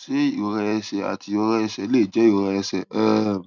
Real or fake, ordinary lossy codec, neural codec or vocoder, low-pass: real; none; none; 7.2 kHz